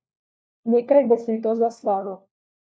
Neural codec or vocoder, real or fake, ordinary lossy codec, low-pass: codec, 16 kHz, 1 kbps, FunCodec, trained on LibriTTS, 50 frames a second; fake; none; none